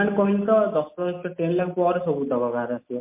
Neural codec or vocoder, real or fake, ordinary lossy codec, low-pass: none; real; MP3, 24 kbps; 3.6 kHz